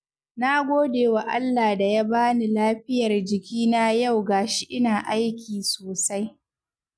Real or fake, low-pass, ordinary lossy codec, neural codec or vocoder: real; none; none; none